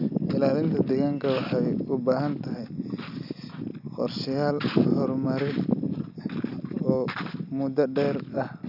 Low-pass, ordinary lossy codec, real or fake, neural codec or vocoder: 5.4 kHz; none; real; none